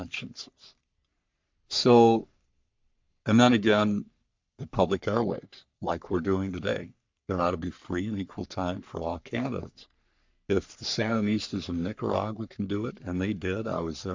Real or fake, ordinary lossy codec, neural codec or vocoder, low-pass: fake; MP3, 64 kbps; codec, 44.1 kHz, 3.4 kbps, Pupu-Codec; 7.2 kHz